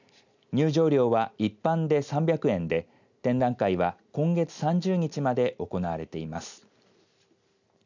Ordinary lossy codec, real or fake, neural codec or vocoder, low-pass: none; real; none; 7.2 kHz